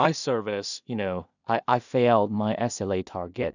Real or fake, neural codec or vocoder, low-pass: fake; codec, 16 kHz in and 24 kHz out, 0.4 kbps, LongCat-Audio-Codec, two codebook decoder; 7.2 kHz